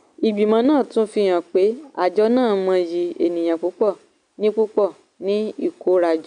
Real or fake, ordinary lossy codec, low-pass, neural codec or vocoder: real; none; 9.9 kHz; none